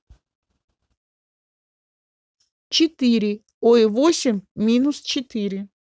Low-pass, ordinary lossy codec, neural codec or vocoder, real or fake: none; none; none; real